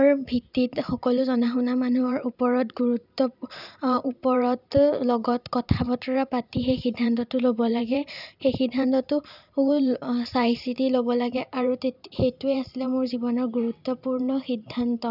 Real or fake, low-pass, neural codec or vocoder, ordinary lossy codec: fake; 5.4 kHz; vocoder, 44.1 kHz, 128 mel bands every 512 samples, BigVGAN v2; none